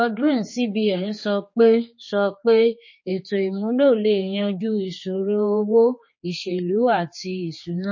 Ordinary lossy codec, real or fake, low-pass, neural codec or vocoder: MP3, 32 kbps; fake; 7.2 kHz; codec, 16 kHz, 4 kbps, X-Codec, HuBERT features, trained on general audio